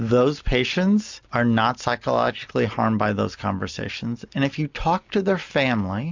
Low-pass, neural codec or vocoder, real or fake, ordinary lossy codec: 7.2 kHz; none; real; MP3, 64 kbps